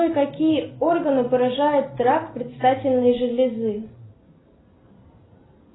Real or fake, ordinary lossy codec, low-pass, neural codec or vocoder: real; AAC, 16 kbps; 7.2 kHz; none